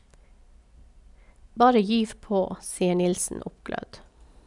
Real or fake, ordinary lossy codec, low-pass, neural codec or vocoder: real; none; 10.8 kHz; none